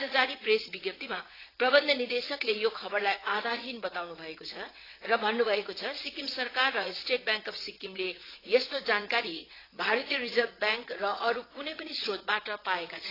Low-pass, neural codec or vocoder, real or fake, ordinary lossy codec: 5.4 kHz; vocoder, 22.05 kHz, 80 mel bands, WaveNeXt; fake; AAC, 24 kbps